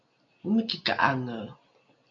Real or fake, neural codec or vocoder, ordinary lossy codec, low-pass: real; none; MP3, 48 kbps; 7.2 kHz